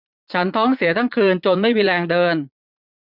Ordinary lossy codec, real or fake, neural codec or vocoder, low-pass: none; fake; vocoder, 44.1 kHz, 80 mel bands, Vocos; 5.4 kHz